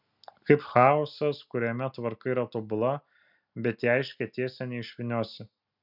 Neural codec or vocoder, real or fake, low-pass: none; real; 5.4 kHz